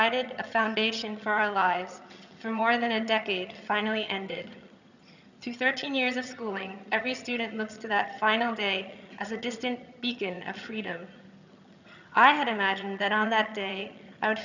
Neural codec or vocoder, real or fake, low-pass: vocoder, 22.05 kHz, 80 mel bands, HiFi-GAN; fake; 7.2 kHz